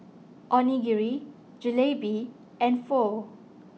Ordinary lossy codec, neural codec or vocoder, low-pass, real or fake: none; none; none; real